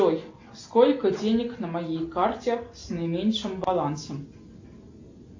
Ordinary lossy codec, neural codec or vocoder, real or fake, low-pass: AAC, 48 kbps; none; real; 7.2 kHz